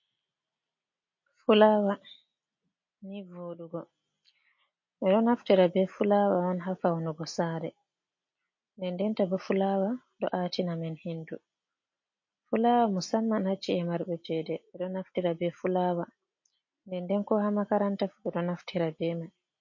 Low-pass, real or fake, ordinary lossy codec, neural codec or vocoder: 7.2 kHz; real; MP3, 32 kbps; none